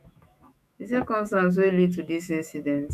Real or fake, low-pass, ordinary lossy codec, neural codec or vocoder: fake; 14.4 kHz; none; autoencoder, 48 kHz, 128 numbers a frame, DAC-VAE, trained on Japanese speech